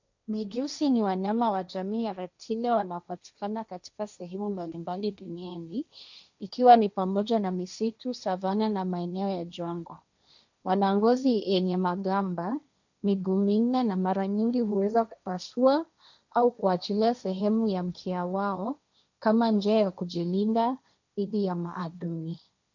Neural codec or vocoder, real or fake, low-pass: codec, 16 kHz, 1.1 kbps, Voila-Tokenizer; fake; 7.2 kHz